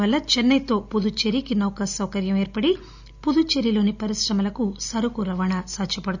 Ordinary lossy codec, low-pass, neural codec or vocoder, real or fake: none; 7.2 kHz; none; real